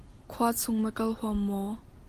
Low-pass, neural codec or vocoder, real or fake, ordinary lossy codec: 14.4 kHz; none; real; Opus, 24 kbps